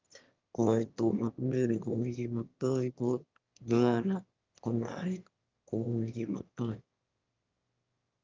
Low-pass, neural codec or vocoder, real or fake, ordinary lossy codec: 7.2 kHz; autoencoder, 22.05 kHz, a latent of 192 numbers a frame, VITS, trained on one speaker; fake; Opus, 24 kbps